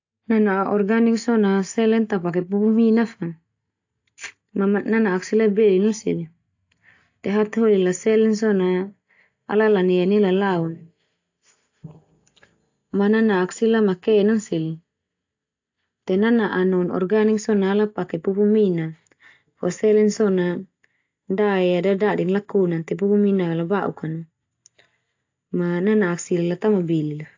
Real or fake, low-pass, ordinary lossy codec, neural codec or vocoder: real; 7.2 kHz; AAC, 48 kbps; none